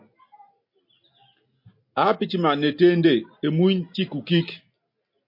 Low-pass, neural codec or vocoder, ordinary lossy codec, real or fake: 5.4 kHz; none; MP3, 32 kbps; real